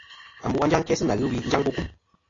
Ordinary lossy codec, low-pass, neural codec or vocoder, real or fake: AAC, 32 kbps; 7.2 kHz; none; real